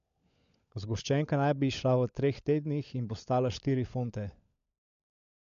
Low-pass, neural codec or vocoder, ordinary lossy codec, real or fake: 7.2 kHz; codec, 16 kHz, 16 kbps, FunCodec, trained on LibriTTS, 50 frames a second; MP3, 64 kbps; fake